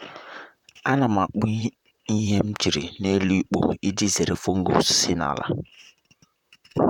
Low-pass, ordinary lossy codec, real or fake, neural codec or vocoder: 19.8 kHz; none; fake; vocoder, 44.1 kHz, 128 mel bands, Pupu-Vocoder